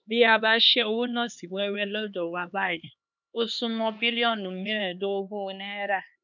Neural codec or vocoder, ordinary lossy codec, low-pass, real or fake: codec, 16 kHz, 2 kbps, X-Codec, HuBERT features, trained on LibriSpeech; none; 7.2 kHz; fake